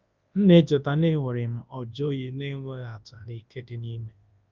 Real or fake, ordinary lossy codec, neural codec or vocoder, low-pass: fake; Opus, 24 kbps; codec, 24 kHz, 0.9 kbps, WavTokenizer, large speech release; 7.2 kHz